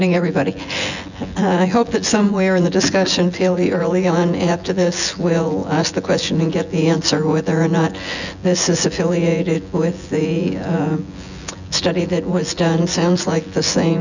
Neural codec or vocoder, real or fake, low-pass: vocoder, 24 kHz, 100 mel bands, Vocos; fake; 7.2 kHz